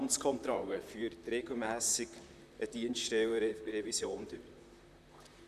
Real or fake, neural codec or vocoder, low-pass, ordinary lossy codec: fake; vocoder, 44.1 kHz, 128 mel bands, Pupu-Vocoder; 14.4 kHz; none